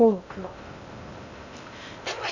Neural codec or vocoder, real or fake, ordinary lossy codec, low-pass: codec, 16 kHz in and 24 kHz out, 0.6 kbps, FocalCodec, streaming, 2048 codes; fake; none; 7.2 kHz